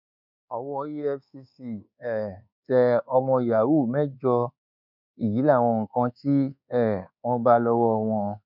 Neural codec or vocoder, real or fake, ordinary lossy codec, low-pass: codec, 24 kHz, 1.2 kbps, DualCodec; fake; none; 5.4 kHz